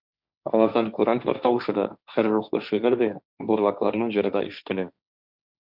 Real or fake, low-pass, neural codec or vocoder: fake; 5.4 kHz; codec, 16 kHz, 1.1 kbps, Voila-Tokenizer